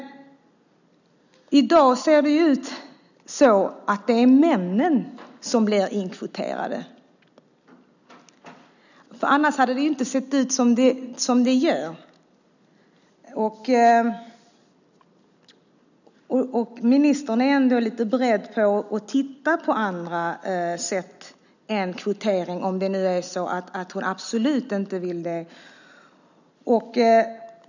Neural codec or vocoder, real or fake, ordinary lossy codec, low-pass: none; real; none; 7.2 kHz